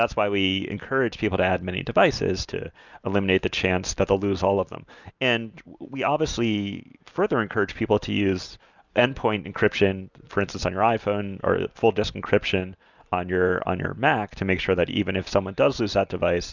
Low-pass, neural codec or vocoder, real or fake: 7.2 kHz; none; real